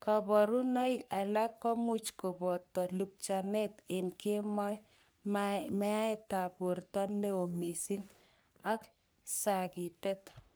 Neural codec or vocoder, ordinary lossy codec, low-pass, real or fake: codec, 44.1 kHz, 3.4 kbps, Pupu-Codec; none; none; fake